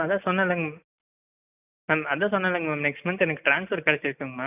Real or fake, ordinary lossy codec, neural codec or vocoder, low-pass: real; none; none; 3.6 kHz